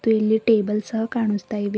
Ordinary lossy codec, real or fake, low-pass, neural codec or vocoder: none; real; none; none